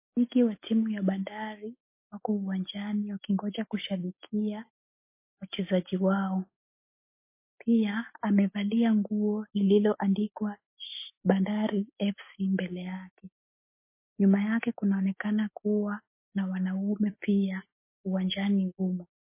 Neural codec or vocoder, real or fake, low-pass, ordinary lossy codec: none; real; 3.6 kHz; MP3, 24 kbps